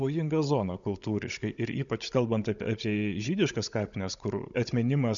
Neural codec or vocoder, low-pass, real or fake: codec, 16 kHz, 16 kbps, FunCodec, trained on Chinese and English, 50 frames a second; 7.2 kHz; fake